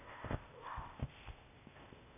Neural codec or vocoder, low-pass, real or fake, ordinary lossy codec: codec, 16 kHz, 0.8 kbps, ZipCodec; 3.6 kHz; fake; none